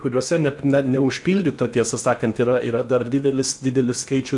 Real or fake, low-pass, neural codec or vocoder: fake; 10.8 kHz; codec, 16 kHz in and 24 kHz out, 0.8 kbps, FocalCodec, streaming, 65536 codes